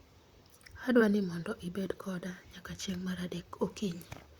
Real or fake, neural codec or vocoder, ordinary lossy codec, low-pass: fake; vocoder, 44.1 kHz, 128 mel bands, Pupu-Vocoder; Opus, 64 kbps; 19.8 kHz